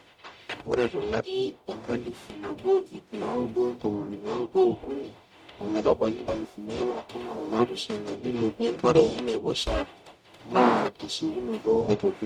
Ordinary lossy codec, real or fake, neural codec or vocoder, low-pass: none; fake; codec, 44.1 kHz, 0.9 kbps, DAC; 19.8 kHz